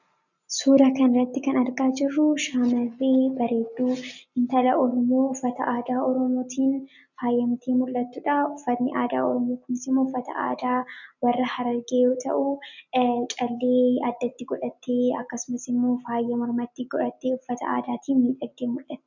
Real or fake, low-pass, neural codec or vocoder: real; 7.2 kHz; none